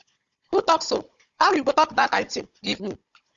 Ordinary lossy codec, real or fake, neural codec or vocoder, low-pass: Opus, 64 kbps; fake; codec, 16 kHz, 16 kbps, FunCodec, trained on Chinese and English, 50 frames a second; 7.2 kHz